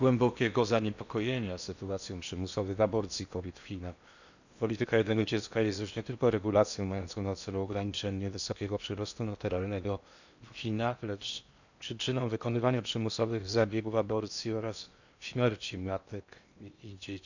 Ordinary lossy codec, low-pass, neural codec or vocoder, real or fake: none; 7.2 kHz; codec, 16 kHz in and 24 kHz out, 0.8 kbps, FocalCodec, streaming, 65536 codes; fake